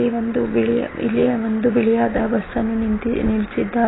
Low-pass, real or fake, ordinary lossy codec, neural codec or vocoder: 7.2 kHz; real; AAC, 16 kbps; none